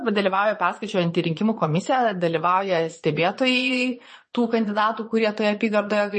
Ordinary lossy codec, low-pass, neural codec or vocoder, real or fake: MP3, 32 kbps; 9.9 kHz; vocoder, 22.05 kHz, 80 mel bands, Vocos; fake